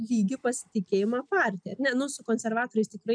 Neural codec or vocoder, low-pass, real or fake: none; 9.9 kHz; real